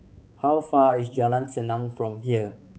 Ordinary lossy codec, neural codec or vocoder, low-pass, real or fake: none; codec, 16 kHz, 4 kbps, X-Codec, HuBERT features, trained on balanced general audio; none; fake